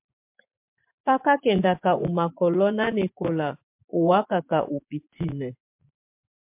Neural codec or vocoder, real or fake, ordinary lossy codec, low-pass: vocoder, 24 kHz, 100 mel bands, Vocos; fake; MP3, 32 kbps; 3.6 kHz